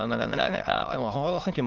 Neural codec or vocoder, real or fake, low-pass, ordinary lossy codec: autoencoder, 22.05 kHz, a latent of 192 numbers a frame, VITS, trained on many speakers; fake; 7.2 kHz; Opus, 16 kbps